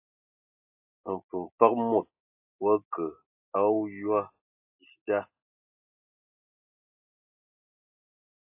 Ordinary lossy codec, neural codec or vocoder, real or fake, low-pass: AAC, 24 kbps; none; real; 3.6 kHz